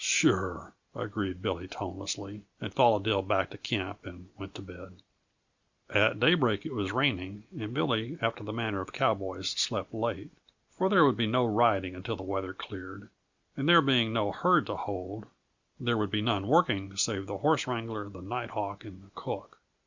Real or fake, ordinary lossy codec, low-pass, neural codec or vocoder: real; Opus, 64 kbps; 7.2 kHz; none